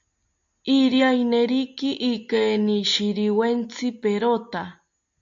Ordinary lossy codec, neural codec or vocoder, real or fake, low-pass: AAC, 64 kbps; none; real; 7.2 kHz